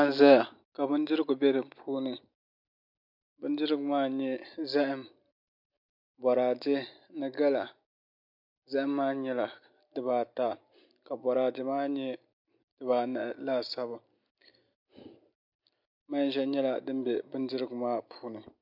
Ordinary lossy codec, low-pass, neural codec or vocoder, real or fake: MP3, 48 kbps; 5.4 kHz; none; real